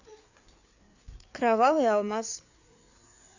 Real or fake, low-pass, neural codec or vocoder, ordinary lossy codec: fake; 7.2 kHz; codec, 16 kHz, 4 kbps, FreqCodec, larger model; none